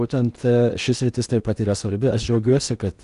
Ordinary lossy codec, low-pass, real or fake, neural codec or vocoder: Opus, 64 kbps; 10.8 kHz; fake; codec, 16 kHz in and 24 kHz out, 0.8 kbps, FocalCodec, streaming, 65536 codes